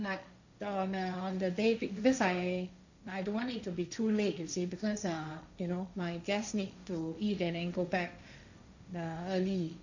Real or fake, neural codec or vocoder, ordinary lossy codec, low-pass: fake; codec, 16 kHz, 1.1 kbps, Voila-Tokenizer; none; 7.2 kHz